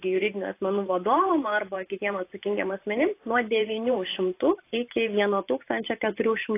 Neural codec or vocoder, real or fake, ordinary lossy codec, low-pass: vocoder, 44.1 kHz, 128 mel bands every 512 samples, BigVGAN v2; fake; AAC, 24 kbps; 3.6 kHz